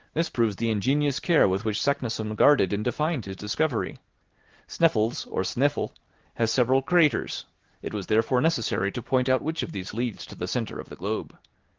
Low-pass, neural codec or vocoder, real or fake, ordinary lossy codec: 7.2 kHz; none; real; Opus, 16 kbps